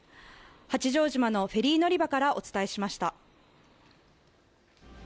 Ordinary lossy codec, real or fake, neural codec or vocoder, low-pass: none; real; none; none